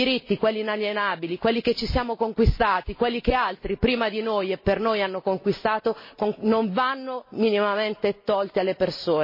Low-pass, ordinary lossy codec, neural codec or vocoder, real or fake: 5.4 kHz; MP3, 24 kbps; none; real